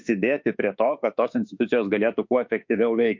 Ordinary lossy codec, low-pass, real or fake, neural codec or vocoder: MP3, 48 kbps; 7.2 kHz; fake; autoencoder, 48 kHz, 32 numbers a frame, DAC-VAE, trained on Japanese speech